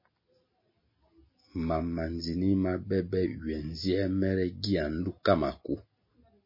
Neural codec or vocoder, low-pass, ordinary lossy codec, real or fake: none; 5.4 kHz; MP3, 24 kbps; real